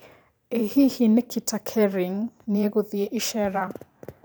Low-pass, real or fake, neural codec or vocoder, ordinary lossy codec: none; fake; vocoder, 44.1 kHz, 128 mel bands every 512 samples, BigVGAN v2; none